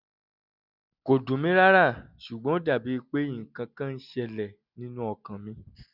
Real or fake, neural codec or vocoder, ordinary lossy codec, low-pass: real; none; none; 5.4 kHz